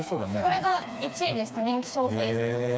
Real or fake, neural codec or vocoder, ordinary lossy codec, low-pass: fake; codec, 16 kHz, 2 kbps, FreqCodec, smaller model; none; none